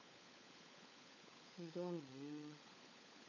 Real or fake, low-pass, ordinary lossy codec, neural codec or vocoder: fake; 7.2 kHz; none; codec, 16 kHz, 16 kbps, FunCodec, trained on LibriTTS, 50 frames a second